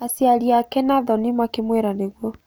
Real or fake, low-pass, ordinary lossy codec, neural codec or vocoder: real; none; none; none